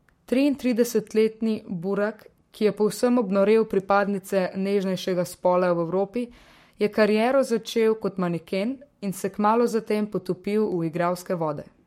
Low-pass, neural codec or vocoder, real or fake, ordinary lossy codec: 19.8 kHz; codec, 44.1 kHz, 7.8 kbps, Pupu-Codec; fake; MP3, 64 kbps